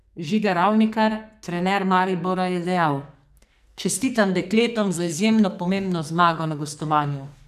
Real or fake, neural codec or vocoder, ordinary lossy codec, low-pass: fake; codec, 44.1 kHz, 2.6 kbps, SNAC; none; 14.4 kHz